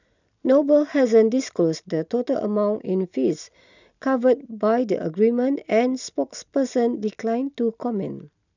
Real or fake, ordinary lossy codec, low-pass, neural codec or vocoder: real; none; 7.2 kHz; none